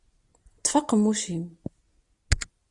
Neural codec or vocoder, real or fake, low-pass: none; real; 10.8 kHz